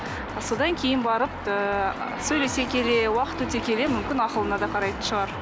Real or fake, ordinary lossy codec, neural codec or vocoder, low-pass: real; none; none; none